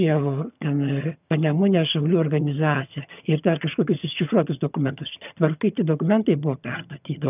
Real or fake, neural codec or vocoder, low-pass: fake; vocoder, 22.05 kHz, 80 mel bands, HiFi-GAN; 3.6 kHz